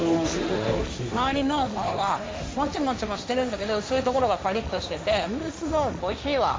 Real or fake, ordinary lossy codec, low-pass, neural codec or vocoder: fake; none; none; codec, 16 kHz, 1.1 kbps, Voila-Tokenizer